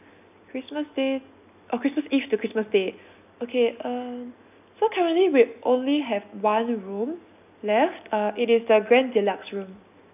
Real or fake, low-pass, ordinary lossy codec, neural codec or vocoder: real; 3.6 kHz; none; none